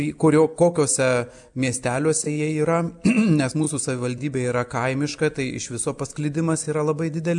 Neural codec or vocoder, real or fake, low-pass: none; real; 10.8 kHz